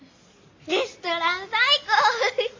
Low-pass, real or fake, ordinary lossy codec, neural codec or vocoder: 7.2 kHz; real; MP3, 48 kbps; none